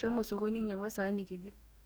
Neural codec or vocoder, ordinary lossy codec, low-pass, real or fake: codec, 44.1 kHz, 2.6 kbps, DAC; none; none; fake